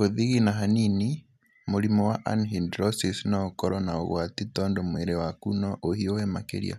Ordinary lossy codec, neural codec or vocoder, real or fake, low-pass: none; none; real; 14.4 kHz